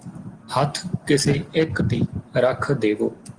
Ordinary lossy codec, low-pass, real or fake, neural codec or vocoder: Opus, 24 kbps; 9.9 kHz; real; none